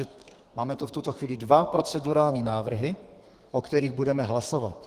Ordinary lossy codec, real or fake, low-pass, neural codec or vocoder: Opus, 24 kbps; fake; 14.4 kHz; codec, 32 kHz, 1.9 kbps, SNAC